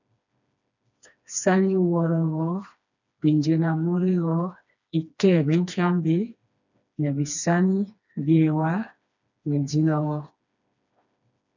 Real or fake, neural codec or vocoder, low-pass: fake; codec, 16 kHz, 2 kbps, FreqCodec, smaller model; 7.2 kHz